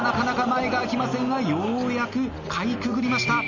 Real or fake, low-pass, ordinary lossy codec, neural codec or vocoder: real; 7.2 kHz; none; none